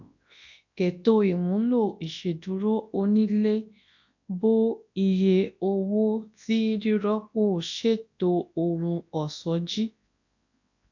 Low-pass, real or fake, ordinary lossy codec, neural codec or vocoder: 7.2 kHz; fake; AAC, 48 kbps; codec, 24 kHz, 0.9 kbps, WavTokenizer, large speech release